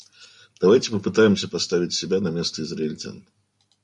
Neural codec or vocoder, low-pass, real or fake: none; 10.8 kHz; real